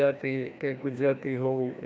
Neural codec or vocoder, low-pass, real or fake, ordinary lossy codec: codec, 16 kHz, 1 kbps, FreqCodec, larger model; none; fake; none